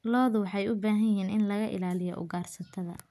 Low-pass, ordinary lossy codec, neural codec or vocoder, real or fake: 14.4 kHz; none; none; real